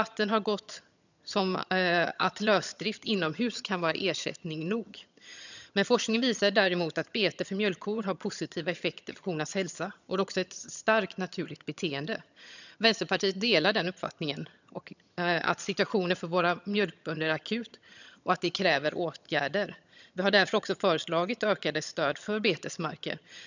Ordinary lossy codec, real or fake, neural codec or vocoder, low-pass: none; fake; vocoder, 22.05 kHz, 80 mel bands, HiFi-GAN; 7.2 kHz